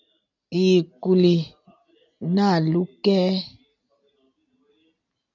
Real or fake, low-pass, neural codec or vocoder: real; 7.2 kHz; none